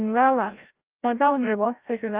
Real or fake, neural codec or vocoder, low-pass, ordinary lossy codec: fake; codec, 16 kHz, 0.5 kbps, FreqCodec, larger model; 3.6 kHz; Opus, 32 kbps